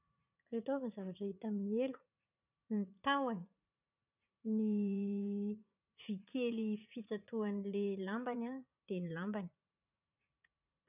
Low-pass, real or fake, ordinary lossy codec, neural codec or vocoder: 3.6 kHz; fake; none; vocoder, 44.1 kHz, 80 mel bands, Vocos